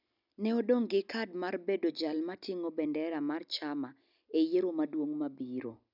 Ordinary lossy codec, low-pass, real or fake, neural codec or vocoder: none; 5.4 kHz; real; none